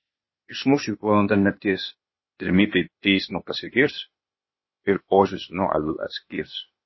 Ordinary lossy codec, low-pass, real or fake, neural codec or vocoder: MP3, 24 kbps; 7.2 kHz; fake; codec, 16 kHz, 0.8 kbps, ZipCodec